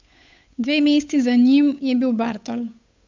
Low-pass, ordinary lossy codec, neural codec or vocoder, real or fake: 7.2 kHz; MP3, 64 kbps; codec, 16 kHz, 8 kbps, FunCodec, trained on Chinese and English, 25 frames a second; fake